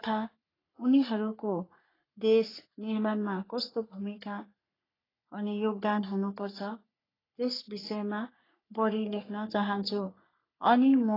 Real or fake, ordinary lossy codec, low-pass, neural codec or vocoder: fake; AAC, 24 kbps; 5.4 kHz; codec, 44.1 kHz, 3.4 kbps, Pupu-Codec